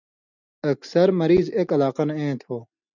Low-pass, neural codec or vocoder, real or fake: 7.2 kHz; none; real